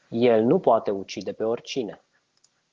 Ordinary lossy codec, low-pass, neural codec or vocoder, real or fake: Opus, 16 kbps; 7.2 kHz; none; real